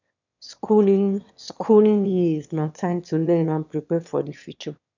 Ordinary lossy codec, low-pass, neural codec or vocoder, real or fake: none; 7.2 kHz; autoencoder, 22.05 kHz, a latent of 192 numbers a frame, VITS, trained on one speaker; fake